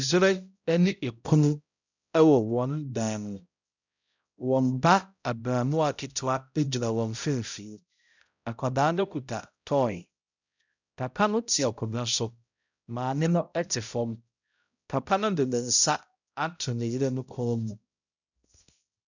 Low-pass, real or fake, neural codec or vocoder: 7.2 kHz; fake; codec, 16 kHz, 0.5 kbps, X-Codec, HuBERT features, trained on balanced general audio